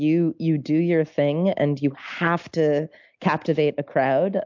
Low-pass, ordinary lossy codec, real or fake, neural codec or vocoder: 7.2 kHz; MP3, 64 kbps; real; none